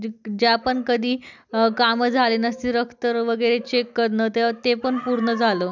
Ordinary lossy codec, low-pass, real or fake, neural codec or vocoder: none; 7.2 kHz; real; none